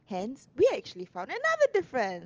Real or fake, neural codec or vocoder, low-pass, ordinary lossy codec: real; none; 7.2 kHz; Opus, 24 kbps